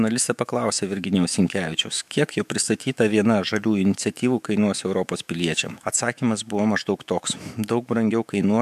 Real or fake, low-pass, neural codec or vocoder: fake; 14.4 kHz; autoencoder, 48 kHz, 128 numbers a frame, DAC-VAE, trained on Japanese speech